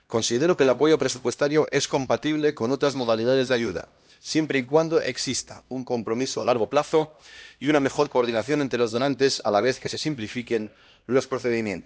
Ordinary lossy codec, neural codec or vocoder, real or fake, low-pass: none; codec, 16 kHz, 1 kbps, X-Codec, HuBERT features, trained on LibriSpeech; fake; none